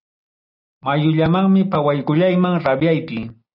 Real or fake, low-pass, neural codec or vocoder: real; 5.4 kHz; none